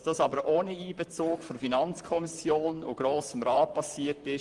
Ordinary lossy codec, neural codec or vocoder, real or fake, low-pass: Opus, 24 kbps; vocoder, 44.1 kHz, 128 mel bands, Pupu-Vocoder; fake; 10.8 kHz